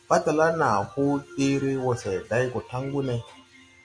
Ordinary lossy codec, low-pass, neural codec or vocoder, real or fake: MP3, 64 kbps; 9.9 kHz; none; real